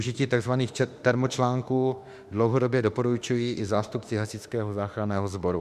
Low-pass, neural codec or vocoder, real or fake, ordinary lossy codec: 14.4 kHz; autoencoder, 48 kHz, 32 numbers a frame, DAC-VAE, trained on Japanese speech; fake; Opus, 64 kbps